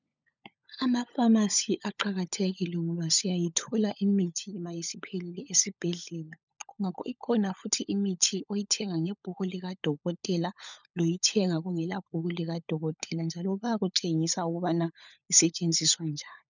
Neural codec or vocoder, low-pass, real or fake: codec, 16 kHz, 16 kbps, FunCodec, trained on LibriTTS, 50 frames a second; 7.2 kHz; fake